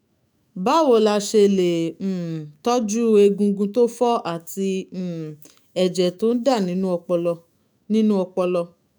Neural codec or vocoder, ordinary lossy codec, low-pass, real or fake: autoencoder, 48 kHz, 128 numbers a frame, DAC-VAE, trained on Japanese speech; none; none; fake